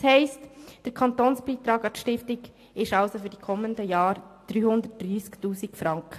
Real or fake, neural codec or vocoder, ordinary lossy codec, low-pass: real; none; AAC, 64 kbps; 14.4 kHz